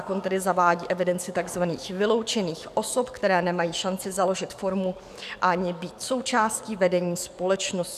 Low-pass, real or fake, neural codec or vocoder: 14.4 kHz; fake; codec, 44.1 kHz, 7.8 kbps, DAC